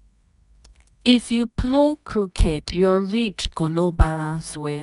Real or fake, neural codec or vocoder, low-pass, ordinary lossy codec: fake; codec, 24 kHz, 0.9 kbps, WavTokenizer, medium music audio release; 10.8 kHz; none